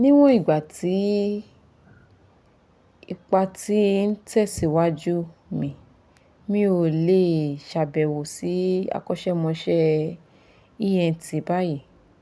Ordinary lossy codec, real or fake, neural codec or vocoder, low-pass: none; real; none; none